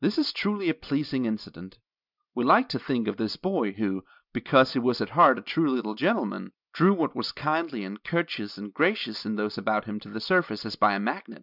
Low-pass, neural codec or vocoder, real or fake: 5.4 kHz; none; real